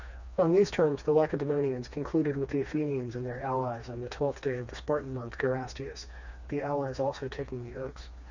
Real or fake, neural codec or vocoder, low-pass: fake; codec, 16 kHz, 2 kbps, FreqCodec, smaller model; 7.2 kHz